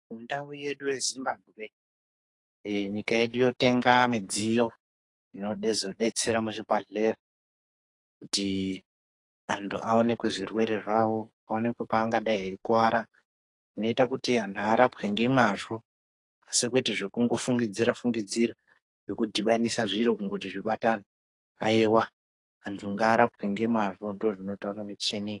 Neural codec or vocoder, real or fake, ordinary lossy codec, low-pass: codec, 44.1 kHz, 2.6 kbps, SNAC; fake; AAC, 48 kbps; 10.8 kHz